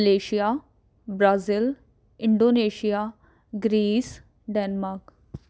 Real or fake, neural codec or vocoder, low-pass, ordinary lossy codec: real; none; none; none